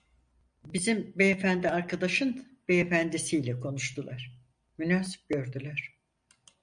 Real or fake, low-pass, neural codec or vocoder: real; 9.9 kHz; none